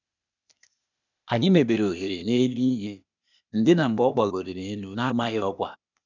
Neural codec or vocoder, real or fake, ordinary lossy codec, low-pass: codec, 16 kHz, 0.8 kbps, ZipCodec; fake; none; 7.2 kHz